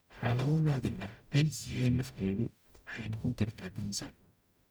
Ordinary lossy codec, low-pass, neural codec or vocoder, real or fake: none; none; codec, 44.1 kHz, 0.9 kbps, DAC; fake